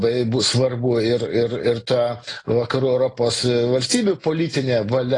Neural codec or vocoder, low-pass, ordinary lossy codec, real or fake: none; 10.8 kHz; AAC, 32 kbps; real